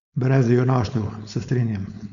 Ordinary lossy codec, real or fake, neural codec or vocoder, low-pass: none; fake; codec, 16 kHz, 4.8 kbps, FACodec; 7.2 kHz